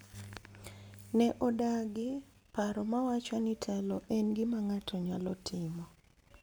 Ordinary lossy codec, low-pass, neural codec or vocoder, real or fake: none; none; none; real